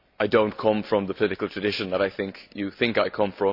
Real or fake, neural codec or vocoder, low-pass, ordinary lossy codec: real; none; 5.4 kHz; none